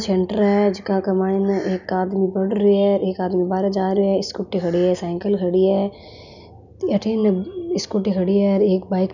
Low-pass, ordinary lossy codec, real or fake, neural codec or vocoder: 7.2 kHz; none; real; none